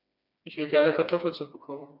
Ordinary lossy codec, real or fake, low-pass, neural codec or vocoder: none; fake; 5.4 kHz; codec, 16 kHz, 2 kbps, FreqCodec, smaller model